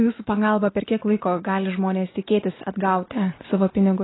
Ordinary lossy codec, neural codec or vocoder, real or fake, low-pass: AAC, 16 kbps; none; real; 7.2 kHz